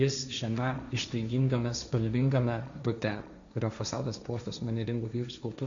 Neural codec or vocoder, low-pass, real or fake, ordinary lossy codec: codec, 16 kHz, 1.1 kbps, Voila-Tokenizer; 7.2 kHz; fake; MP3, 64 kbps